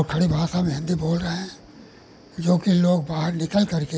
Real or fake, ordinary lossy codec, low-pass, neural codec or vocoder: real; none; none; none